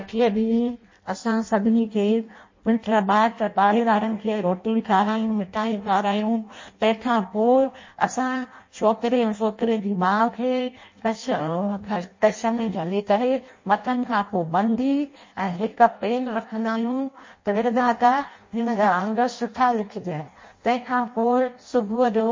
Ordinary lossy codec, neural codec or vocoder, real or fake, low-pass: MP3, 32 kbps; codec, 16 kHz in and 24 kHz out, 0.6 kbps, FireRedTTS-2 codec; fake; 7.2 kHz